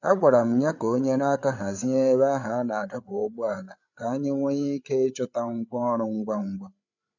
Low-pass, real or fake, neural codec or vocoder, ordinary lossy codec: 7.2 kHz; fake; codec, 16 kHz, 8 kbps, FreqCodec, larger model; none